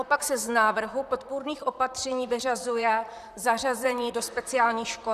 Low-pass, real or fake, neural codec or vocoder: 14.4 kHz; fake; vocoder, 44.1 kHz, 128 mel bands, Pupu-Vocoder